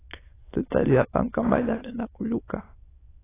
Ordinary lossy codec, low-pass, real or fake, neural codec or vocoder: AAC, 16 kbps; 3.6 kHz; fake; autoencoder, 22.05 kHz, a latent of 192 numbers a frame, VITS, trained on many speakers